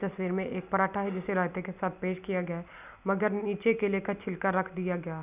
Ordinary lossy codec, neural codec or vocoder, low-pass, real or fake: none; none; 3.6 kHz; real